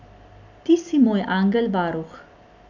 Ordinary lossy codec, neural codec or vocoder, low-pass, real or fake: none; none; 7.2 kHz; real